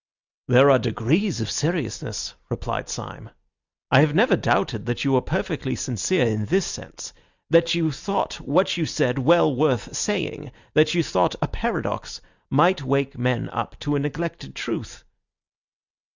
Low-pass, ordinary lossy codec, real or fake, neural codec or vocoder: 7.2 kHz; Opus, 64 kbps; real; none